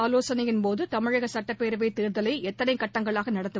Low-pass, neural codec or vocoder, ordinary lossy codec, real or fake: none; none; none; real